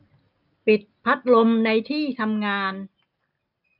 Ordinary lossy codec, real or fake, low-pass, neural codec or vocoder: none; real; 5.4 kHz; none